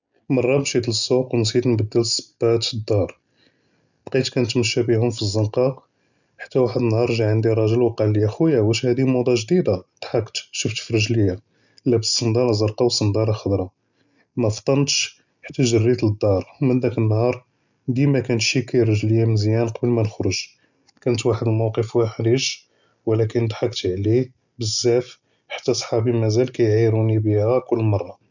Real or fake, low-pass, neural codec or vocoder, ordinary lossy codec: real; 7.2 kHz; none; none